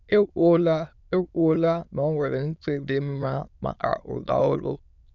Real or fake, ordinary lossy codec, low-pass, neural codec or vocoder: fake; none; 7.2 kHz; autoencoder, 22.05 kHz, a latent of 192 numbers a frame, VITS, trained on many speakers